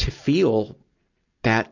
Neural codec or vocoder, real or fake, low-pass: none; real; 7.2 kHz